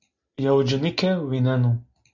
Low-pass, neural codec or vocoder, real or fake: 7.2 kHz; none; real